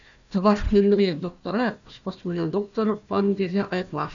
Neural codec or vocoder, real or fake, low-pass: codec, 16 kHz, 1 kbps, FunCodec, trained on Chinese and English, 50 frames a second; fake; 7.2 kHz